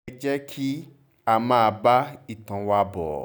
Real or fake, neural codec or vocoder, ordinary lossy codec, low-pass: real; none; none; none